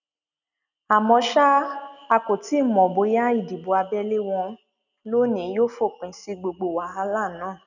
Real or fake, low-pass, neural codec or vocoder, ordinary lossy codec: real; 7.2 kHz; none; none